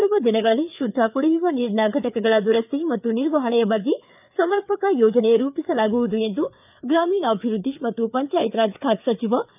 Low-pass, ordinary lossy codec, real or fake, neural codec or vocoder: 3.6 kHz; none; fake; codec, 16 kHz, 4 kbps, FreqCodec, larger model